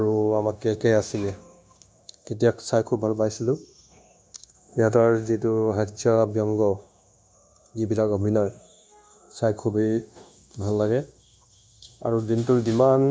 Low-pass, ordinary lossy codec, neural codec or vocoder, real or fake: none; none; codec, 16 kHz, 0.9 kbps, LongCat-Audio-Codec; fake